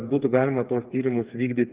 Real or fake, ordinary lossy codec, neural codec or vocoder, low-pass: fake; Opus, 32 kbps; codec, 16 kHz, 4 kbps, FreqCodec, smaller model; 3.6 kHz